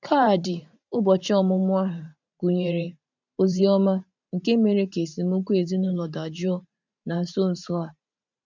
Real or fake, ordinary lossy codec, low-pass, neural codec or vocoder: fake; none; 7.2 kHz; vocoder, 44.1 kHz, 128 mel bands every 512 samples, BigVGAN v2